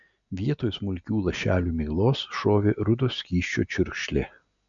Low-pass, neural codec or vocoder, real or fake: 7.2 kHz; none; real